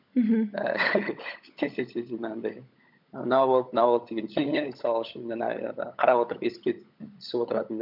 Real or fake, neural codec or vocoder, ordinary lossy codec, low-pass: fake; codec, 16 kHz, 16 kbps, FunCodec, trained on LibriTTS, 50 frames a second; none; 5.4 kHz